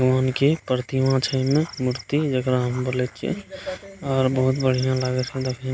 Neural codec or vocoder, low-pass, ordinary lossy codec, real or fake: none; none; none; real